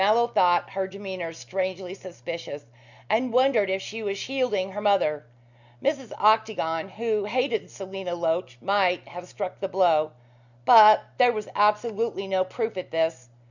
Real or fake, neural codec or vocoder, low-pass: real; none; 7.2 kHz